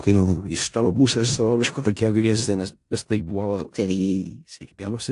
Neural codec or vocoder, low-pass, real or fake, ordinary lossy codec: codec, 16 kHz in and 24 kHz out, 0.4 kbps, LongCat-Audio-Codec, four codebook decoder; 10.8 kHz; fake; AAC, 64 kbps